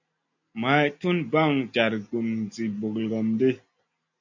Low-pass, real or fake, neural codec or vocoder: 7.2 kHz; real; none